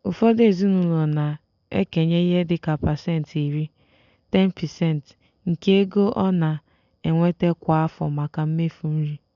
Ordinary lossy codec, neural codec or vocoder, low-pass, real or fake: none; none; 7.2 kHz; real